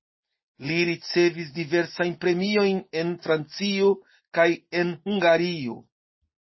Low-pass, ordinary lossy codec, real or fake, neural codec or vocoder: 7.2 kHz; MP3, 24 kbps; real; none